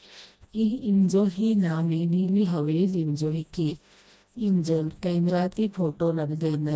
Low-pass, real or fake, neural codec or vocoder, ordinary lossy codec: none; fake; codec, 16 kHz, 1 kbps, FreqCodec, smaller model; none